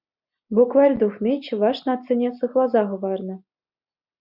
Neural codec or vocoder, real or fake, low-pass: none; real; 5.4 kHz